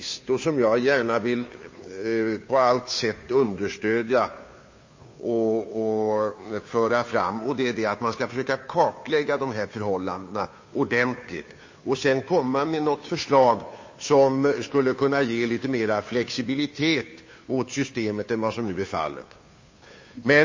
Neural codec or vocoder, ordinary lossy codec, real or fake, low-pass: codec, 16 kHz, 2 kbps, FunCodec, trained on Chinese and English, 25 frames a second; MP3, 32 kbps; fake; 7.2 kHz